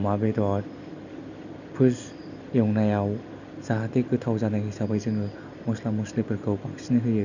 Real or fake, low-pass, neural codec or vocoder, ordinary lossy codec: real; 7.2 kHz; none; none